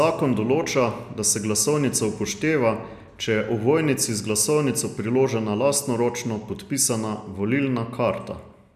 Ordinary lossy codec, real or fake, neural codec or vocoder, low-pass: none; real; none; 14.4 kHz